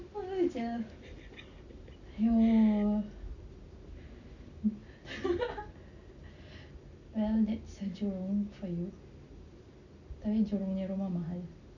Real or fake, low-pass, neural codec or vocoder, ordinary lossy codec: real; 7.2 kHz; none; none